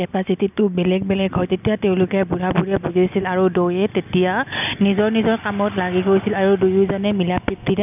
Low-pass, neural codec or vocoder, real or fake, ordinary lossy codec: 3.6 kHz; none; real; none